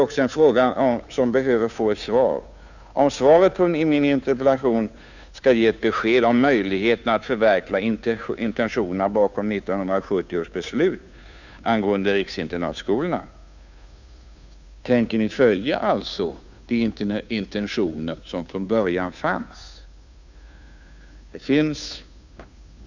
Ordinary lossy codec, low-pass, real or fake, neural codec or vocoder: none; 7.2 kHz; fake; codec, 16 kHz, 2 kbps, FunCodec, trained on Chinese and English, 25 frames a second